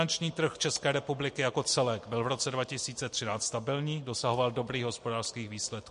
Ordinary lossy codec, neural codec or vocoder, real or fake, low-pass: MP3, 48 kbps; none; real; 10.8 kHz